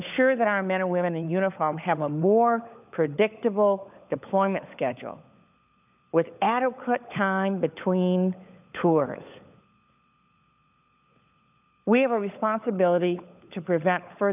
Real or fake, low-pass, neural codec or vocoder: fake; 3.6 kHz; codec, 16 kHz, 16 kbps, FunCodec, trained on LibriTTS, 50 frames a second